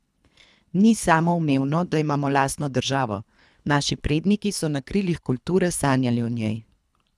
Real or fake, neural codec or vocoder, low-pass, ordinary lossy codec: fake; codec, 24 kHz, 3 kbps, HILCodec; none; none